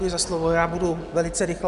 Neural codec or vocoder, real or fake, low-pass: none; real; 10.8 kHz